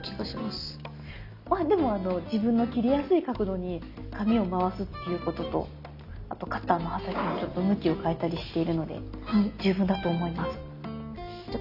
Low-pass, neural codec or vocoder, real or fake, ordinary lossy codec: 5.4 kHz; none; real; none